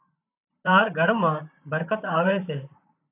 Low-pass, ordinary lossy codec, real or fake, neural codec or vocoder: 3.6 kHz; AAC, 32 kbps; fake; vocoder, 44.1 kHz, 128 mel bands, Pupu-Vocoder